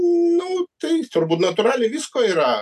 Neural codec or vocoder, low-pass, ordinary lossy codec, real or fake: none; 14.4 kHz; AAC, 96 kbps; real